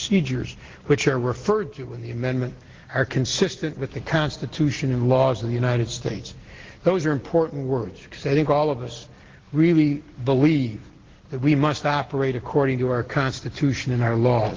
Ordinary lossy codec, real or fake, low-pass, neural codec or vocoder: Opus, 16 kbps; real; 7.2 kHz; none